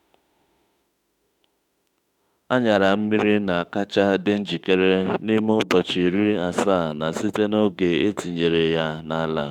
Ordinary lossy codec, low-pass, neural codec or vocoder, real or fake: none; 19.8 kHz; autoencoder, 48 kHz, 32 numbers a frame, DAC-VAE, trained on Japanese speech; fake